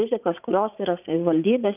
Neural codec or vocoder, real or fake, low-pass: vocoder, 44.1 kHz, 80 mel bands, Vocos; fake; 3.6 kHz